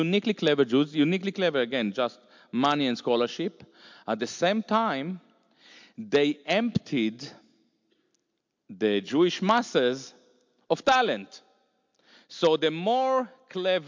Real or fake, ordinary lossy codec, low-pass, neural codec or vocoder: real; MP3, 64 kbps; 7.2 kHz; none